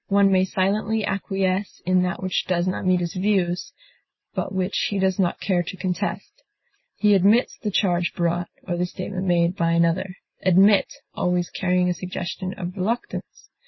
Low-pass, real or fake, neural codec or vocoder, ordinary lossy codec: 7.2 kHz; real; none; MP3, 24 kbps